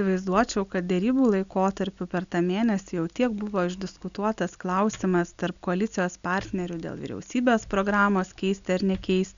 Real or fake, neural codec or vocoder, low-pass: real; none; 7.2 kHz